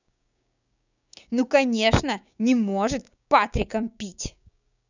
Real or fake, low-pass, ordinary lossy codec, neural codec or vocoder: fake; 7.2 kHz; none; codec, 16 kHz, 6 kbps, DAC